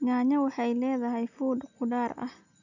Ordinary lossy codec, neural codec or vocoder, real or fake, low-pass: none; none; real; 7.2 kHz